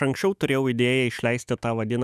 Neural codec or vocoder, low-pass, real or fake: autoencoder, 48 kHz, 128 numbers a frame, DAC-VAE, trained on Japanese speech; 14.4 kHz; fake